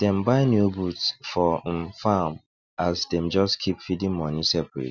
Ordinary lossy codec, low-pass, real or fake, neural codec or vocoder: none; 7.2 kHz; real; none